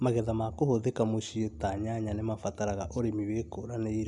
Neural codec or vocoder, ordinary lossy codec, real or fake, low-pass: none; none; real; 10.8 kHz